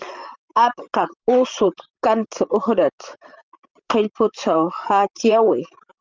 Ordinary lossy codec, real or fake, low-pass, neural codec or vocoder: Opus, 24 kbps; fake; 7.2 kHz; vocoder, 44.1 kHz, 128 mel bands, Pupu-Vocoder